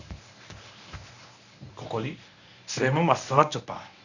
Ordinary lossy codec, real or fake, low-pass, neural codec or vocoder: none; fake; 7.2 kHz; codec, 24 kHz, 0.9 kbps, WavTokenizer, medium speech release version 1